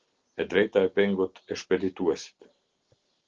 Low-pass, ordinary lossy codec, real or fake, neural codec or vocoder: 7.2 kHz; Opus, 16 kbps; real; none